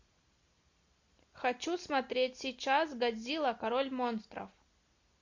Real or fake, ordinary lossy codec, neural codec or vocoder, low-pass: real; MP3, 48 kbps; none; 7.2 kHz